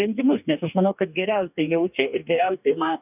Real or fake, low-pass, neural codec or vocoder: fake; 3.6 kHz; codec, 44.1 kHz, 2.6 kbps, DAC